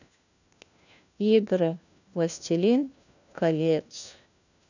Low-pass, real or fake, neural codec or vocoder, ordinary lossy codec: 7.2 kHz; fake; codec, 16 kHz, 1 kbps, FunCodec, trained on LibriTTS, 50 frames a second; none